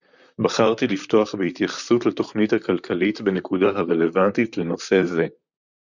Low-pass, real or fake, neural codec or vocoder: 7.2 kHz; fake; vocoder, 44.1 kHz, 128 mel bands, Pupu-Vocoder